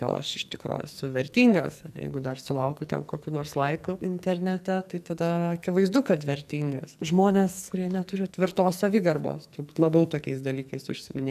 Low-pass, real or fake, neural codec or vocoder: 14.4 kHz; fake; codec, 44.1 kHz, 2.6 kbps, SNAC